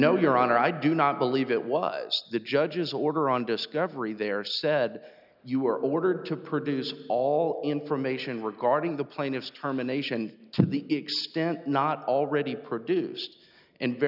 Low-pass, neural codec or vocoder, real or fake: 5.4 kHz; none; real